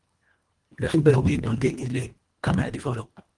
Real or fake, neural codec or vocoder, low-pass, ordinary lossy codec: fake; codec, 24 kHz, 1.5 kbps, HILCodec; 10.8 kHz; Opus, 24 kbps